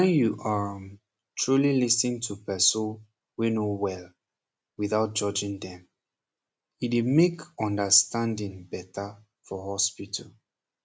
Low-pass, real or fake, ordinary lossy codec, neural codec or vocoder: none; real; none; none